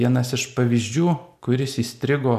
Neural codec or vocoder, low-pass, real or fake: none; 14.4 kHz; real